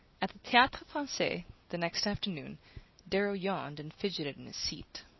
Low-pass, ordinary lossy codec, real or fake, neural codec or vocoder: 7.2 kHz; MP3, 24 kbps; real; none